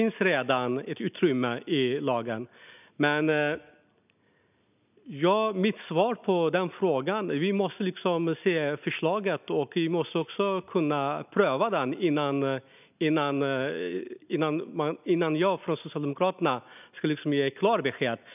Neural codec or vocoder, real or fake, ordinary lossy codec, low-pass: none; real; none; 3.6 kHz